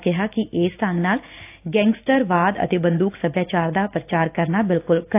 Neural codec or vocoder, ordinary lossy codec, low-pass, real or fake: none; AAC, 24 kbps; 3.6 kHz; real